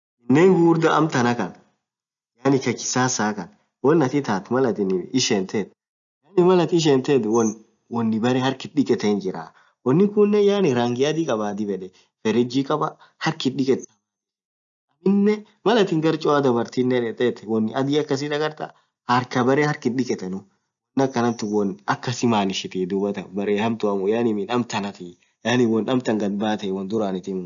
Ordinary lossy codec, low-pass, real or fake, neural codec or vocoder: none; 7.2 kHz; real; none